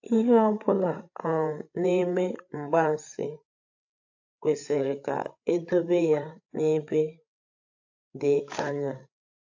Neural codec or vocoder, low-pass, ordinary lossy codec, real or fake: codec, 16 kHz, 8 kbps, FreqCodec, larger model; 7.2 kHz; AAC, 48 kbps; fake